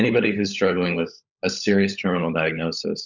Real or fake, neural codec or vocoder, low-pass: fake; codec, 16 kHz, 16 kbps, FunCodec, trained on LibriTTS, 50 frames a second; 7.2 kHz